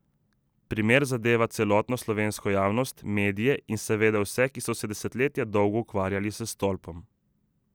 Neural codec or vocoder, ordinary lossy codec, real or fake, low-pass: none; none; real; none